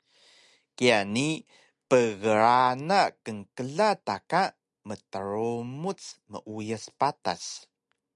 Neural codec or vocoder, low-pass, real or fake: none; 10.8 kHz; real